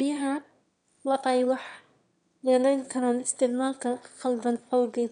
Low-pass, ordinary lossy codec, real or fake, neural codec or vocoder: 9.9 kHz; none; fake; autoencoder, 22.05 kHz, a latent of 192 numbers a frame, VITS, trained on one speaker